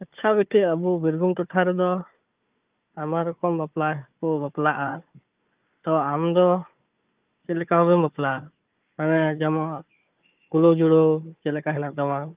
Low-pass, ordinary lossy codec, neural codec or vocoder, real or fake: 3.6 kHz; Opus, 24 kbps; codec, 16 kHz, 4 kbps, FunCodec, trained on Chinese and English, 50 frames a second; fake